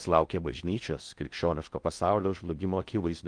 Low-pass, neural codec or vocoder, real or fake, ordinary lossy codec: 9.9 kHz; codec, 16 kHz in and 24 kHz out, 0.6 kbps, FocalCodec, streaming, 4096 codes; fake; Opus, 32 kbps